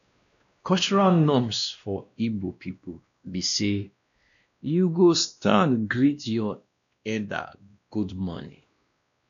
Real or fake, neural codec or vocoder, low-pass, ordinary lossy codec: fake; codec, 16 kHz, 1 kbps, X-Codec, WavLM features, trained on Multilingual LibriSpeech; 7.2 kHz; none